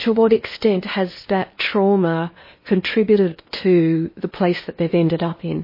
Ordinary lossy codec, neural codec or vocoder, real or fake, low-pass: MP3, 24 kbps; codec, 16 kHz in and 24 kHz out, 0.8 kbps, FocalCodec, streaming, 65536 codes; fake; 5.4 kHz